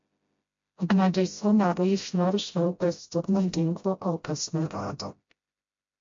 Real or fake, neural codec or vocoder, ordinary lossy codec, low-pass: fake; codec, 16 kHz, 0.5 kbps, FreqCodec, smaller model; MP3, 48 kbps; 7.2 kHz